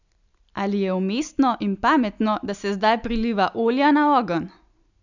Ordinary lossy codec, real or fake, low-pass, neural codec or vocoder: none; real; 7.2 kHz; none